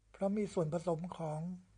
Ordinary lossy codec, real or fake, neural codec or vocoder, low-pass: MP3, 96 kbps; real; none; 9.9 kHz